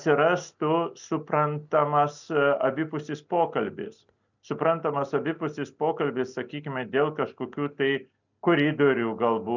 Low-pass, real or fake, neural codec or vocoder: 7.2 kHz; real; none